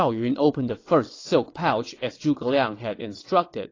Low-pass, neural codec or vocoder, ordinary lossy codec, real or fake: 7.2 kHz; vocoder, 22.05 kHz, 80 mel bands, WaveNeXt; AAC, 32 kbps; fake